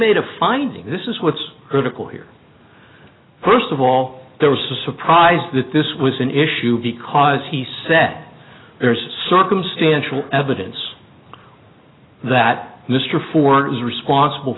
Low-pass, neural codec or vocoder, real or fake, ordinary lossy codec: 7.2 kHz; none; real; AAC, 16 kbps